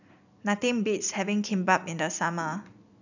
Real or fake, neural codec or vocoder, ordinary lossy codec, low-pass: real; none; none; 7.2 kHz